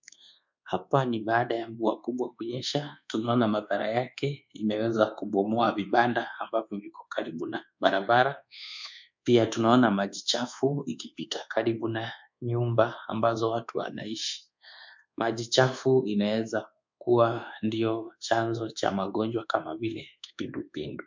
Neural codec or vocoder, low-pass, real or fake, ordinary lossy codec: codec, 24 kHz, 1.2 kbps, DualCodec; 7.2 kHz; fake; MP3, 64 kbps